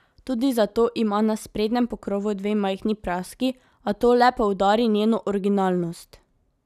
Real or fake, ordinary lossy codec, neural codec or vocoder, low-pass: real; none; none; 14.4 kHz